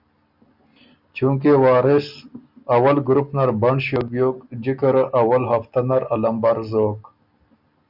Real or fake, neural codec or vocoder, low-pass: real; none; 5.4 kHz